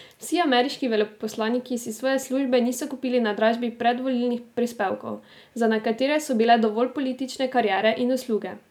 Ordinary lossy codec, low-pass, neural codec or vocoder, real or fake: none; 19.8 kHz; none; real